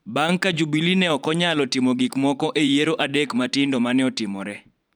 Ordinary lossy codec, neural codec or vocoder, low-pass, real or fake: none; vocoder, 44.1 kHz, 128 mel bands every 512 samples, BigVGAN v2; 19.8 kHz; fake